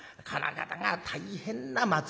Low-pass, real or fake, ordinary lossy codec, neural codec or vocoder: none; real; none; none